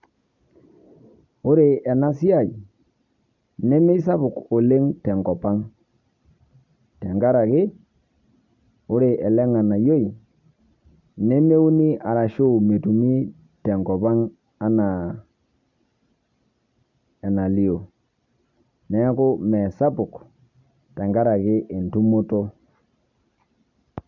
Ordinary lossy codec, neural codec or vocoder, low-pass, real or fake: none; none; 7.2 kHz; real